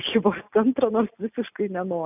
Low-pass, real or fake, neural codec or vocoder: 3.6 kHz; real; none